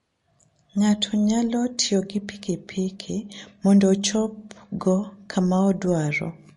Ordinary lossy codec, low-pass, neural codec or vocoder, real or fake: MP3, 48 kbps; 14.4 kHz; none; real